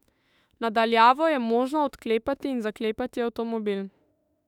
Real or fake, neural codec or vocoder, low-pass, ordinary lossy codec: fake; autoencoder, 48 kHz, 32 numbers a frame, DAC-VAE, trained on Japanese speech; 19.8 kHz; none